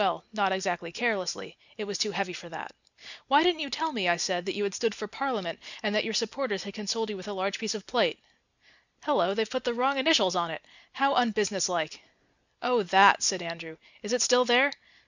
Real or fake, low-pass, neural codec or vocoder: real; 7.2 kHz; none